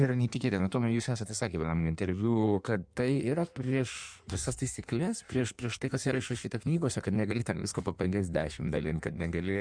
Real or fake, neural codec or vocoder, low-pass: fake; codec, 16 kHz in and 24 kHz out, 1.1 kbps, FireRedTTS-2 codec; 9.9 kHz